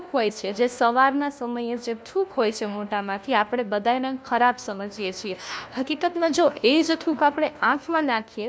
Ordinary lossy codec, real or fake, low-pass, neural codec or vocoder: none; fake; none; codec, 16 kHz, 1 kbps, FunCodec, trained on LibriTTS, 50 frames a second